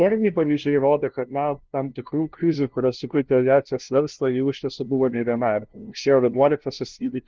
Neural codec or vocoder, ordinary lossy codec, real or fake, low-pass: codec, 16 kHz, 0.5 kbps, FunCodec, trained on LibriTTS, 25 frames a second; Opus, 16 kbps; fake; 7.2 kHz